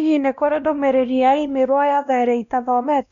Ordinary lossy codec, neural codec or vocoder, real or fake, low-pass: none; codec, 16 kHz, 1 kbps, X-Codec, WavLM features, trained on Multilingual LibriSpeech; fake; 7.2 kHz